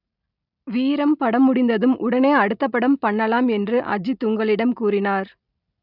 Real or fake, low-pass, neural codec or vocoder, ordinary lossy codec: real; 5.4 kHz; none; none